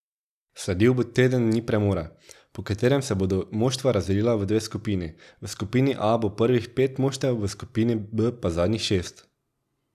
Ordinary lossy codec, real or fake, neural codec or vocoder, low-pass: none; real; none; 14.4 kHz